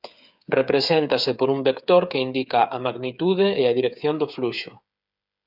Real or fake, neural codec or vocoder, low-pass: fake; codec, 16 kHz, 8 kbps, FreqCodec, smaller model; 5.4 kHz